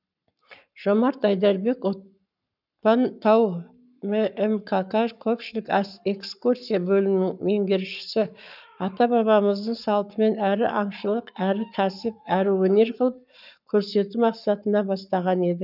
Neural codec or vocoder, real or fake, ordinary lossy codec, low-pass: codec, 44.1 kHz, 7.8 kbps, Pupu-Codec; fake; none; 5.4 kHz